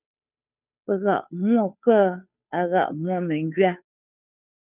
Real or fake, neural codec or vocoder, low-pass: fake; codec, 16 kHz, 2 kbps, FunCodec, trained on Chinese and English, 25 frames a second; 3.6 kHz